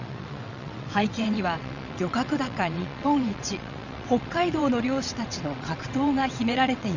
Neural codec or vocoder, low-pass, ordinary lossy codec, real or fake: vocoder, 22.05 kHz, 80 mel bands, WaveNeXt; 7.2 kHz; none; fake